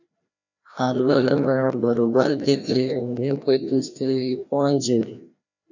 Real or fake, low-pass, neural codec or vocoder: fake; 7.2 kHz; codec, 16 kHz, 1 kbps, FreqCodec, larger model